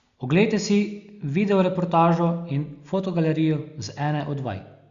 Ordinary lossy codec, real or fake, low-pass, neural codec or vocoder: Opus, 64 kbps; real; 7.2 kHz; none